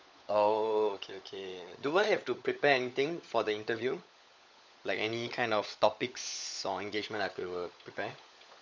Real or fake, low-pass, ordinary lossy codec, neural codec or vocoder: fake; none; none; codec, 16 kHz, 16 kbps, FunCodec, trained on LibriTTS, 50 frames a second